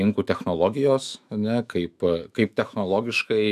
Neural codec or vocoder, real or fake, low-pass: autoencoder, 48 kHz, 128 numbers a frame, DAC-VAE, trained on Japanese speech; fake; 14.4 kHz